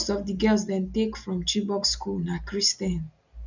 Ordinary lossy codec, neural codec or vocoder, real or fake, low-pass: none; none; real; 7.2 kHz